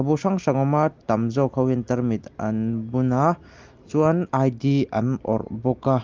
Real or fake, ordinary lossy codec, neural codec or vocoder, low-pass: real; Opus, 32 kbps; none; 7.2 kHz